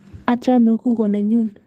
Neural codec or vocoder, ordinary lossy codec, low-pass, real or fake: codec, 32 kHz, 1.9 kbps, SNAC; Opus, 24 kbps; 14.4 kHz; fake